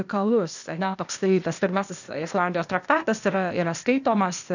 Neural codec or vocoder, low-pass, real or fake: codec, 16 kHz, 0.8 kbps, ZipCodec; 7.2 kHz; fake